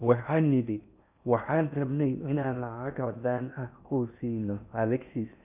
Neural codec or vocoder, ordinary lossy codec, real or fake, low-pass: codec, 16 kHz in and 24 kHz out, 0.6 kbps, FocalCodec, streaming, 2048 codes; none; fake; 3.6 kHz